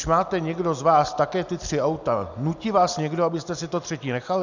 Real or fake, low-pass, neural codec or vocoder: real; 7.2 kHz; none